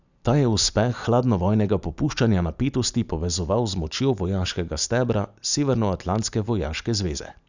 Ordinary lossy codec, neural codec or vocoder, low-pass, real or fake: none; none; 7.2 kHz; real